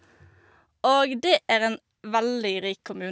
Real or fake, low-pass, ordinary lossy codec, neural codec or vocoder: real; none; none; none